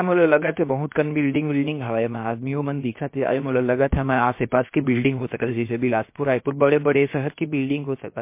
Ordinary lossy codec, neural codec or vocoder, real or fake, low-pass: MP3, 24 kbps; codec, 16 kHz, about 1 kbps, DyCAST, with the encoder's durations; fake; 3.6 kHz